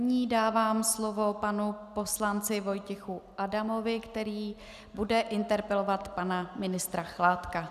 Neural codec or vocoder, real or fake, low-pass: none; real; 14.4 kHz